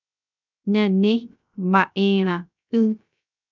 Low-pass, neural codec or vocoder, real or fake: 7.2 kHz; codec, 16 kHz, 0.3 kbps, FocalCodec; fake